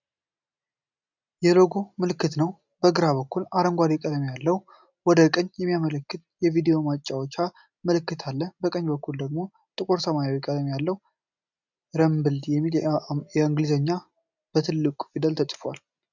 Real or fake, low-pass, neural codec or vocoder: real; 7.2 kHz; none